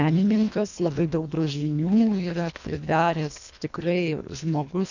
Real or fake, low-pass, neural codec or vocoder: fake; 7.2 kHz; codec, 24 kHz, 1.5 kbps, HILCodec